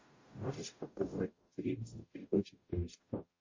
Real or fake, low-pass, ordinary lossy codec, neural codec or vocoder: fake; 7.2 kHz; MP3, 32 kbps; codec, 44.1 kHz, 0.9 kbps, DAC